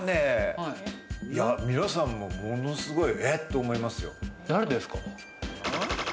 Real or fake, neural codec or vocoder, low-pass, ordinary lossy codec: real; none; none; none